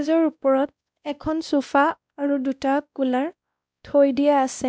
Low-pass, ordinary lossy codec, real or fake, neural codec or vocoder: none; none; fake; codec, 16 kHz, 1 kbps, X-Codec, WavLM features, trained on Multilingual LibriSpeech